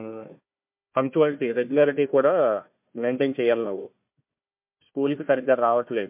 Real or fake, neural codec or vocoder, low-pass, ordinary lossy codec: fake; codec, 16 kHz, 1 kbps, FunCodec, trained on Chinese and English, 50 frames a second; 3.6 kHz; MP3, 32 kbps